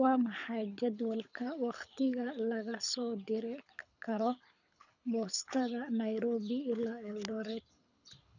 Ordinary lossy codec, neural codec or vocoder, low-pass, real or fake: none; codec, 24 kHz, 6 kbps, HILCodec; 7.2 kHz; fake